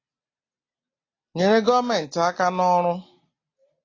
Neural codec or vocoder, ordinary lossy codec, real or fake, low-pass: none; AAC, 32 kbps; real; 7.2 kHz